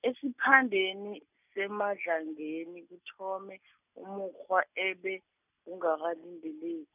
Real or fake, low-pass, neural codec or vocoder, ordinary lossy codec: real; 3.6 kHz; none; none